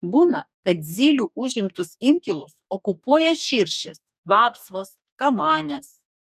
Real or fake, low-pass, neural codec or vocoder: fake; 14.4 kHz; codec, 44.1 kHz, 2.6 kbps, DAC